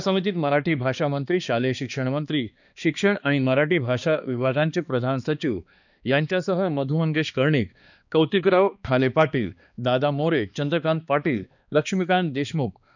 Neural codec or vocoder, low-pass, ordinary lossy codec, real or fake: codec, 16 kHz, 2 kbps, X-Codec, HuBERT features, trained on balanced general audio; 7.2 kHz; none; fake